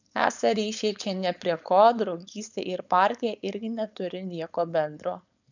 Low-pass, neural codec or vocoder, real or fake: 7.2 kHz; codec, 16 kHz, 4.8 kbps, FACodec; fake